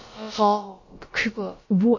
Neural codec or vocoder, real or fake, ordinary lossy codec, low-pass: codec, 16 kHz, about 1 kbps, DyCAST, with the encoder's durations; fake; MP3, 32 kbps; 7.2 kHz